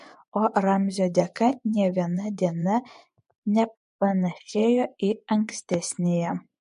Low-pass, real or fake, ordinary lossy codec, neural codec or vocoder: 10.8 kHz; fake; MP3, 64 kbps; vocoder, 24 kHz, 100 mel bands, Vocos